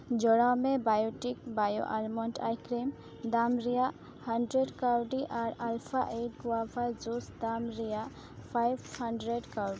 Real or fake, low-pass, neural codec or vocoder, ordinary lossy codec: real; none; none; none